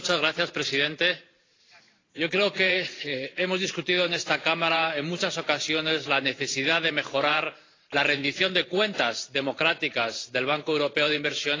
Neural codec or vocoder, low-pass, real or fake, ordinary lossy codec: vocoder, 44.1 kHz, 128 mel bands every 512 samples, BigVGAN v2; 7.2 kHz; fake; AAC, 32 kbps